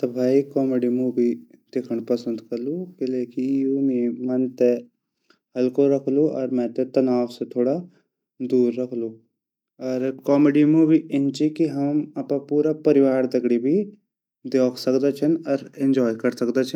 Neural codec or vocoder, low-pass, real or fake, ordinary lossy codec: none; 19.8 kHz; real; none